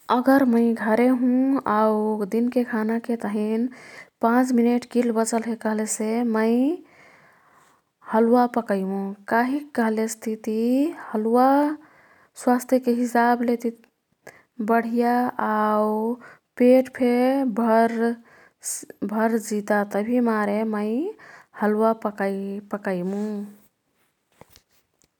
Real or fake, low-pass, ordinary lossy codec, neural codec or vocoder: real; 19.8 kHz; none; none